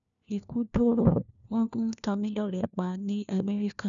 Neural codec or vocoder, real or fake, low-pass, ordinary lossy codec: codec, 16 kHz, 1 kbps, FunCodec, trained on LibriTTS, 50 frames a second; fake; 7.2 kHz; none